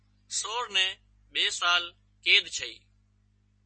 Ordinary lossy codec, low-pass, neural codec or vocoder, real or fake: MP3, 32 kbps; 9.9 kHz; none; real